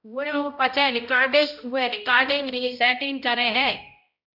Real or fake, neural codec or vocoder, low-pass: fake; codec, 16 kHz, 0.5 kbps, X-Codec, HuBERT features, trained on balanced general audio; 5.4 kHz